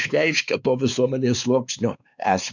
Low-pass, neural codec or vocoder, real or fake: 7.2 kHz; codec, 16 kHz, 2 kbps, X-Codec, WavLM features, trained on Multilingual LibriSpeech; fake